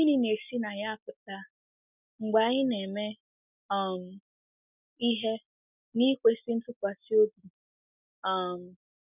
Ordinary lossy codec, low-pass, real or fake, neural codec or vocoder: none; 3.6 kHz; real; none